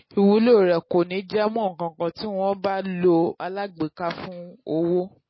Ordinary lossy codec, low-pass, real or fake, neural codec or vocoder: MP3, 24 kbps; 7.2 kHz; real; none